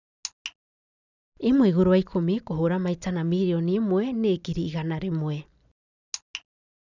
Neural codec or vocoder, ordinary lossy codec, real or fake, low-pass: none; none; real; 7.2 kHz